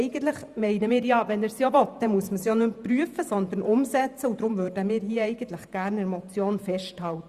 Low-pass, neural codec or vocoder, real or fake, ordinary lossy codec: 14.4 kHz; vocoder, 48 kHz, 128 mel bands, Vocos; fake; Opus, 64 kbps